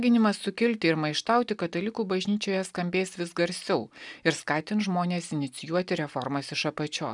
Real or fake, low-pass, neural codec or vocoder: real; 10.8 kHz; none